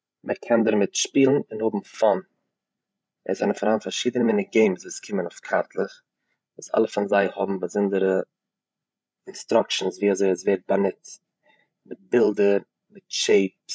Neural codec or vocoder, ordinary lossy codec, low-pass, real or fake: codec, 16 kHz, 16 kbps, FreqCodec, larger model; none; none; fake